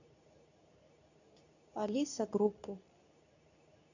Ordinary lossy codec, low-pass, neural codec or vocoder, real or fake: none; 7.2 kHz; codec, 24 kHz, 0.9 kbps, WavTokenizer, medium speech release version 2; fake